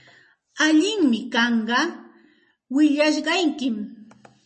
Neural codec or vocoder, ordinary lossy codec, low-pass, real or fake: none; MP3, 32 kbps; 10.8 kHz; real